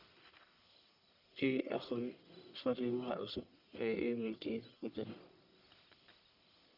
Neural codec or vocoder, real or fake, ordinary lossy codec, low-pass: codec, 44.1 kHz, 1.7 kbps, Pupu-Codec; fake; none; 5.4 kHz